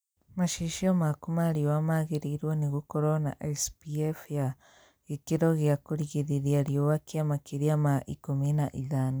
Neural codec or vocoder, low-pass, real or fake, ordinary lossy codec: none; none; real; none